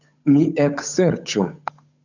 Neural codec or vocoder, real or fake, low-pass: codec, 16 kHz, 8 kbps, FunCodec, trained on Chinese and English, 25 frames a second; fake; 7.2 kHz